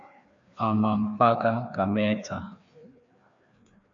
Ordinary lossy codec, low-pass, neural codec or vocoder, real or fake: AAC, 64 kbps; 7.2 kHz; codec, 16 kHz, 2 kbps, FreqCodec, larger model; fake